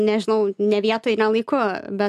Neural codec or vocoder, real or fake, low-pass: none; real; 14.4 kHz